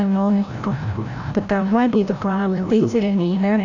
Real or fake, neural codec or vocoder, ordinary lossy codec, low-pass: fake; codec, 16 kHz, 0.5 kbps, FreqCodec, larger model; none; 7.2 kHz